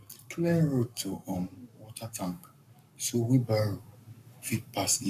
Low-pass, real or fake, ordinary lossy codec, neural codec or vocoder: 14.4 kHz; fake; none; codec, 44.1 kHz, 7.8 kbps, Pupu-Codec